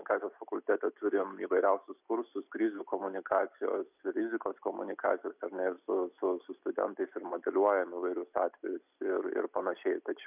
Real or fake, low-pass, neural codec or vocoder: real; 3.6 kHz; none